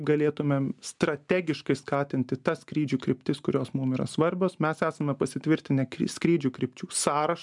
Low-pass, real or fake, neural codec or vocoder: 10.8 kHz; real; none